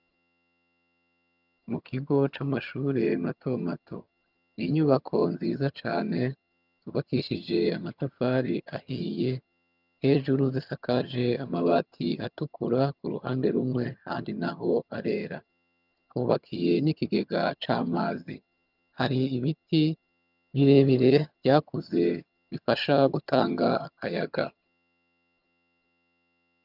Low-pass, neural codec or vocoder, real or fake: 5.4 kHz; vocoder, 22.05 kHz, 80 mel bands, HiFi-GAN; fake